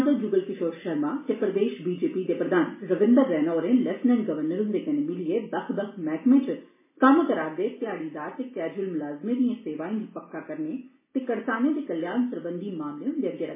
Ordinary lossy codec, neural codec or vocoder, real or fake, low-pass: MP3, 16 kbps; none; real; 3.6 kHz